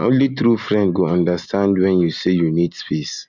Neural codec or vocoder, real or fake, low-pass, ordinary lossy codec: none; real; 7.2 kHz; none